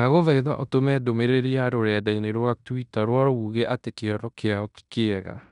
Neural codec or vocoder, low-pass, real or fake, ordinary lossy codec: codec, 16 kHz in and 24 kHz out, 0.9 kbps, LongCat-Audio-Codec, fine tuned four codebook decoder; 10.8 kHz; fake; none